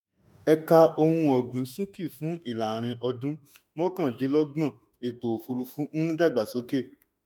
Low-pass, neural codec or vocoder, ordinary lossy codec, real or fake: none; autoencoder, 48 kHz, 32 numbers a frame, DAC-VAE, trained on Japanese speech; none; fake